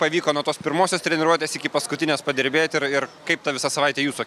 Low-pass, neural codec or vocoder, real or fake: 14.4 kHz; none; real